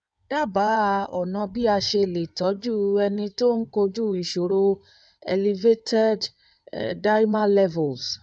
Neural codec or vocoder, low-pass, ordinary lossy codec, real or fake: codec, 16 kHz in and 24 kHz out, 2.2 kbps, FireRedTTS-2 codec; 9.9 kHz; none; fake